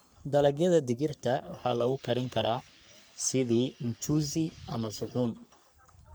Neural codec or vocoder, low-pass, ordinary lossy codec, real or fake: codec, 44.1 kHz, 3.4 kbps, Pupu-Codec; none; none; fake